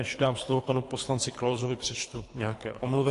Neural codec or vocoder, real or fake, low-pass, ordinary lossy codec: codec, 24 kHz, 3 kbps, HILCodec; fake; 10.8 kHz; AAC, 48 kbps